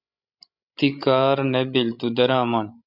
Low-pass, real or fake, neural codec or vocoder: 5.4 kHz; fake; codec, 16 kHz, 16 kbps, FreqCodec, larger model